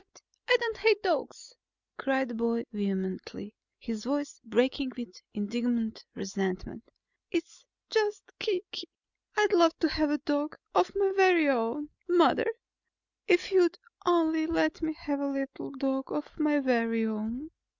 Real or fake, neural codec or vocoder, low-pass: real; none; 7.2 kHz